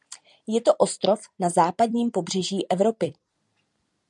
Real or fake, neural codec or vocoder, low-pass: fake; vocoder, 44.1 kHz, 128 mel bands every 256 samples, BigVGAN v2; 10.8 kHz